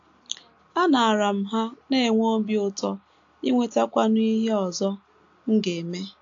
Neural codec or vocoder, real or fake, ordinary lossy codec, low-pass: none; real; AAC, 48 kbps; 7.2 kHz